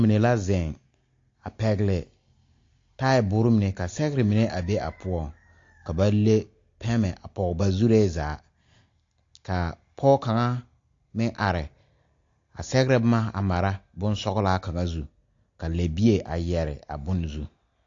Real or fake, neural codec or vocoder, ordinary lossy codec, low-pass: real; none; AAC, 48 kbps; 7.2 kHz